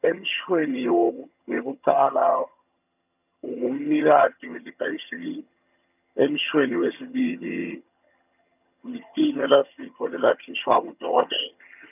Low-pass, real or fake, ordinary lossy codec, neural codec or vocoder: 3.6 kHz; fake; none; vocoder, 22.05 kHz, 80 mel bands, HiFi-GAN